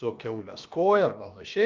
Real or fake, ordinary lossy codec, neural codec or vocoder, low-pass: fake; Opus, 24 kbps; codec, 16 kHz, about 1 kbps, DyCAST, with the encoder's durations; 7.2 kHz